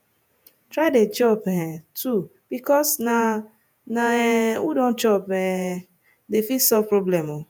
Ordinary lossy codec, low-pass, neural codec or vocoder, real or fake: none; none; vocoder, 48 kHz, 128 mel bands, Vocos; fake